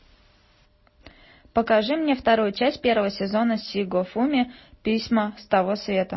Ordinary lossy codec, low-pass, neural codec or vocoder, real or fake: MP3, 24 kbps; 7.2 kHz; none; real